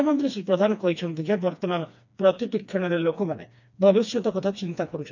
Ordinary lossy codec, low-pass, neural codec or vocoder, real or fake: none; 7.2 kHz; codec, 16 kHz, 2 kbps, FreqCodec, smaller model; fake